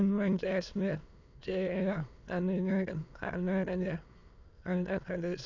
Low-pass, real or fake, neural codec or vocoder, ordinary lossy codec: 7.2 kHz; fake; autoencoder, 22.05 kHz, a latent of 192 numbers a frame, VITS, trained on many speakers; none